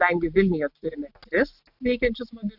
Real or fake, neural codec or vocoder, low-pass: real; none; 5.4 kHz